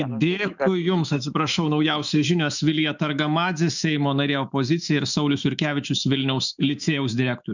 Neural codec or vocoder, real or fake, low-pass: autoencoder, 48 kHz, 128 numbers a frame, DAC-VAE, trained on Japanese speech; fake; 7.2 kHz